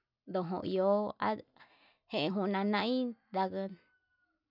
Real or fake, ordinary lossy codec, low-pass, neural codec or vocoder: real; none; 5.4 kHz; none